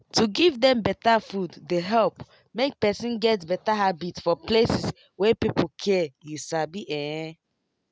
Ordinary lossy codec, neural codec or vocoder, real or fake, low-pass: none; none; real; none